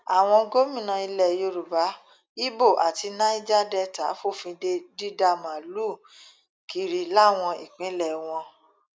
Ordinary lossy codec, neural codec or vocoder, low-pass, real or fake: none; none; none; real